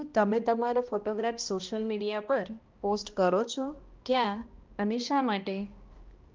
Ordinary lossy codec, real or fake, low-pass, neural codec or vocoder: Opus, 24 kbps; fake; 7.2 kHz; codec, 16 kHz, 1 kbps, X-Codec, HuBERT features, trained on balanced general audio